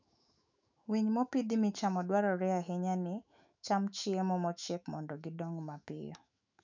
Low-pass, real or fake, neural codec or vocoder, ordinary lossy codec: 7.2 kHz; fake; autoencoder, 48 kHz, 128 numbers a frame, DAC-VAE, trained on Japanese speech; none